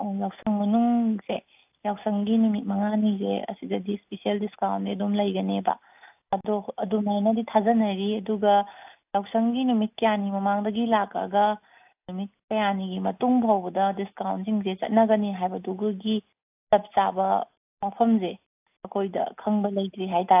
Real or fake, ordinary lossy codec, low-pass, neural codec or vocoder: real; AAC, 32 kbps; 3.6 kHz; none